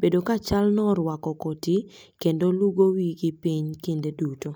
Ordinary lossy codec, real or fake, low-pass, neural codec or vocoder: none; real; none; none